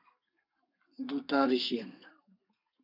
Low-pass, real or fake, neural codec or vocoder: 5.4 kHz; fake; codec, 32 kHz, 1.9 kbps, SNAC